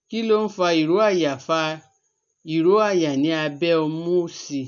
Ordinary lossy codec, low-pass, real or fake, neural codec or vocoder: none; 7.2 kHz; real; none